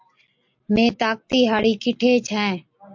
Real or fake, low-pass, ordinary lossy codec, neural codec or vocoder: real; 7.2 kHz; MP3, 48 kbps; none